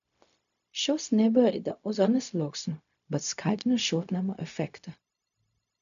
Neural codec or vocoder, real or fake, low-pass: codec, 16 kHz, 0.4 kbps, LongCat-Audio-Codec; fake; 7.2 kHz